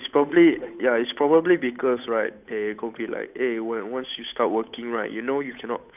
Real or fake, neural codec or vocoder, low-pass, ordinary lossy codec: fake; codec, 16 kHz, 8 kbps, FunCodec, trained on Chinese and English, 25 frames a second; 3.6 kHz; none